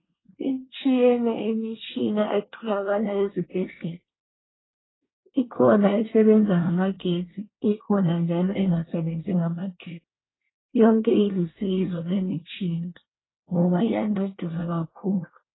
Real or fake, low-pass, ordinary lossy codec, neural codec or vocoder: fake; 7.2 kHz; AAC, 16 kbps; codec, 24 kHz, 1 kbps, SNAC